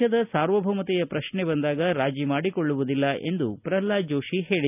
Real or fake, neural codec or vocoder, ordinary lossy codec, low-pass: real; none; none; 3.6 kHz